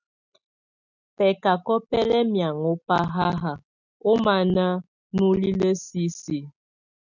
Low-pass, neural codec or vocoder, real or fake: 7.2 kHz; none; real